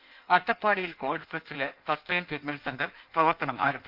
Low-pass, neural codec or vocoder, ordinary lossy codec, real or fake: 5.4 kHz; codec, 16 kHz in and 24 kHz out, 1.1 kbps, FireRedTTS-2 codec; Opus, 24 kbps; fake